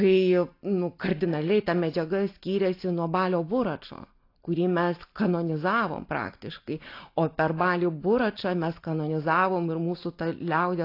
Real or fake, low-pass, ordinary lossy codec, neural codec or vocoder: real; 5.4 kHz; AAC, 32 kbps; none